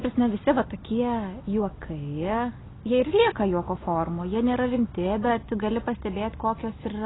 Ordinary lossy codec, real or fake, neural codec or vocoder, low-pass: AAC, 16 kbps; real; none; 7.2 kHz